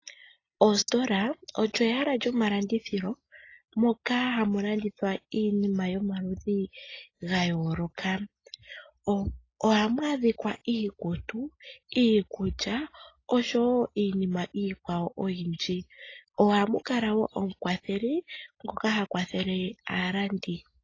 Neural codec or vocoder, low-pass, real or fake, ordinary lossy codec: none; 7.2 kHz; real; AAC, 32 kbps